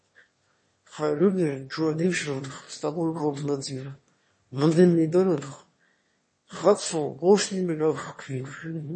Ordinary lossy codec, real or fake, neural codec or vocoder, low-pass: MP3, 32 kbps; fake; autoencoder, 22.05 kHz, a latent of 192 numbers a frame, VITS, trained on one speaker; 9.9 kHz